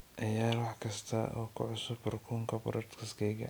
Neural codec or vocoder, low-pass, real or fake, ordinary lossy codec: none; none; real; none